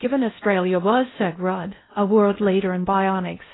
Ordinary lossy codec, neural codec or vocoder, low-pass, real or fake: AAC, 16 kbps; codec, 16 kHz in and 24 kHz out, 0.6 kbps, FocalCodec, streaming, 4096 codes; 7.2 kHz; fake